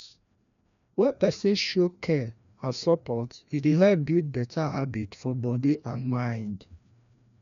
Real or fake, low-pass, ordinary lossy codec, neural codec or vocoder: fake; 7.2 kHz; none; codec, 16 kHz, 1 kbps, FreqCodec, larger model